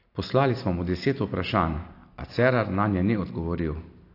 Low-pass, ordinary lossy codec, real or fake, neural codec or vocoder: 5.4 kHz; AAC, 32 kbps; real; none